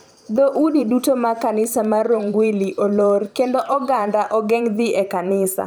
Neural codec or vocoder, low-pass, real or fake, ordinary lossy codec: vocoder, 44.1 kHz, 128 mel bands every 512 samples, BigVGAN v2; none; fake; none